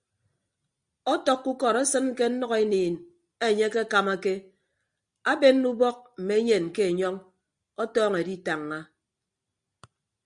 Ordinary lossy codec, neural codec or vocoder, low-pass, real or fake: Opus, 64 kbps; none; 9.9 kHz; real